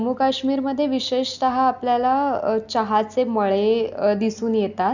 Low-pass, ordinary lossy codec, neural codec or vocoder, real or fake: 7.2 kHz; none; none; real